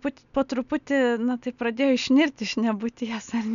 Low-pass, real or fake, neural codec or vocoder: 7.2 kHz; real; none